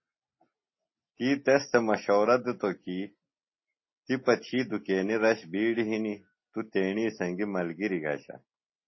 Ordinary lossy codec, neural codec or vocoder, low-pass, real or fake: MP3, 24 kbps; none; 7.2 kHz; real